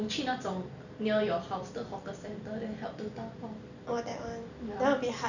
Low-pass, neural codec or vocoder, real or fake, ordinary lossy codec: 7.2 kHz; none; real; none